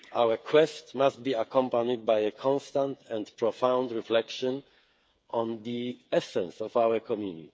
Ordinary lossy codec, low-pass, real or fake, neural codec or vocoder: none; none; fake; codec, 16 kHz, 8 kbps, FreqCodec, smaller model